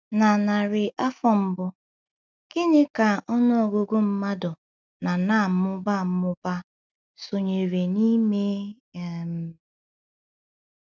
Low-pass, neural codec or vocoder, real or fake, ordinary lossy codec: none; none; real; none